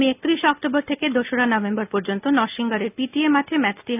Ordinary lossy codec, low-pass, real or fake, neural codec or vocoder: none; 3.6 kHz; real; none